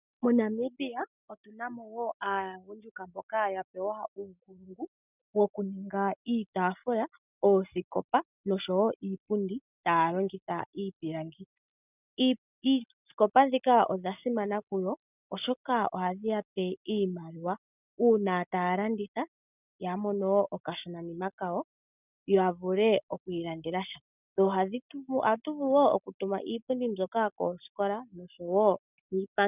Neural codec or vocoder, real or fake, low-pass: none; real; 3.6 kHz